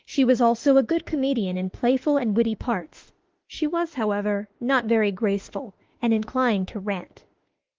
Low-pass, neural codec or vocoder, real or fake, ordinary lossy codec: 7.2 kHz; autoencoder, 48 kHz, 32 numbers a frame, DAC-VAE, trained on Japanese speech; fake; Opus, 16 kbps